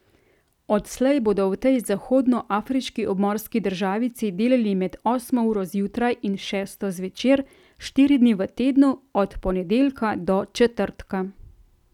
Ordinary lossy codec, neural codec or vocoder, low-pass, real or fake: none; none; 19.8 kHz; real